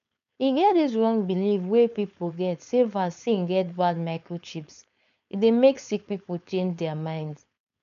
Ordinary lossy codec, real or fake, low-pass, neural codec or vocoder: none; fake; 7.2 kHz; codec, 16 kHz, 4.8 kbps, FACodec